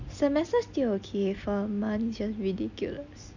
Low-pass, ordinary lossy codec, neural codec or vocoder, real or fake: 7.2 kHz; none; none; real